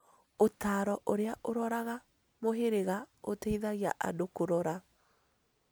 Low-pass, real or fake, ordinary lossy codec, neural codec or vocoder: none; real; none; none